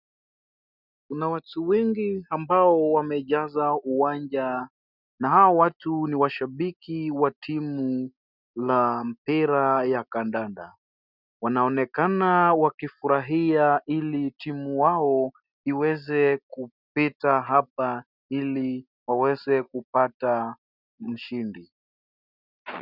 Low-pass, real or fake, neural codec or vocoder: 5.4 kHz; real; none